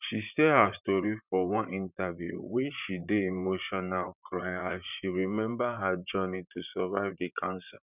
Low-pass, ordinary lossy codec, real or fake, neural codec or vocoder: 3.6 kHz; none; fake; vocoder, 44.1 kHz, 128 mel bands, Pupu-Vocoder